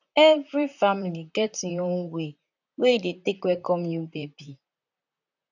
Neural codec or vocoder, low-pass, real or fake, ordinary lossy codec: vocoder, 44.1 kHz, 128 mel bands, Pupu-Vocoder; 7.2 kHz; fake; none